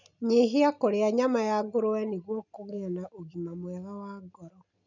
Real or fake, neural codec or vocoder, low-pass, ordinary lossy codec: real; none; 7.2 kHz; none